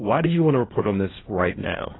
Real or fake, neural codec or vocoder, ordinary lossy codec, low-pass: fake; codec, 16 kHz, 1.1 kbps, Voila-Tokenizer; AAC, 16 kbps; 7.2 kHz